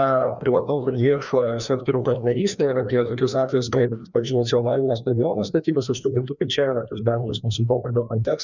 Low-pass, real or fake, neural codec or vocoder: 7.2 kHz; fake; codec, 16 kHz, 1 kbps, FreqCodec, larger model